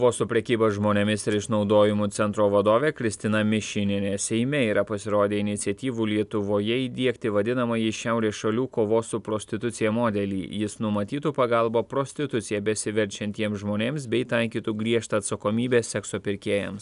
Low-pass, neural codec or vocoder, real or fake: 10.8 kHz; none; real